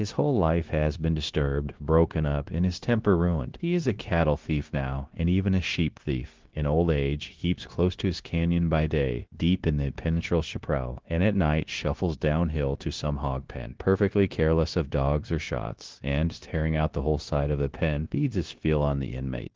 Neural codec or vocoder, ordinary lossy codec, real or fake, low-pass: codec, 16 kHz, 0.9 kbps, LongCat-Audio-Codec; Opus, 16 kbps; fake; 7.2 kHz